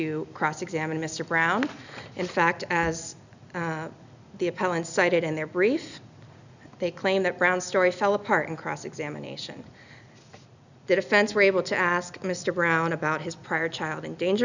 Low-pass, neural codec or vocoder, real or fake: 7.2 kHz; none; real